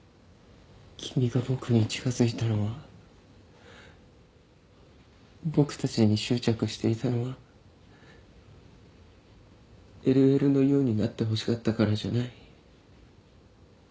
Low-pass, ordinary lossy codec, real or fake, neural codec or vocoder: none; none; real; none